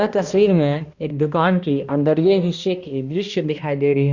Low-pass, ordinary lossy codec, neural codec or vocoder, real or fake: 7.2 kHz; Opus, 64 kbps; codec, 16 kHz, 1 kbps, X-Codec, HuBERT features, trained on balanced general audio; fake